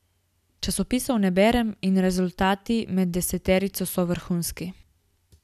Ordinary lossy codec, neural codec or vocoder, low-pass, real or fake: none; none; 14.4 kHz; real